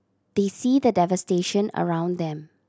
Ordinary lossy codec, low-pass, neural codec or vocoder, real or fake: none; none; none; real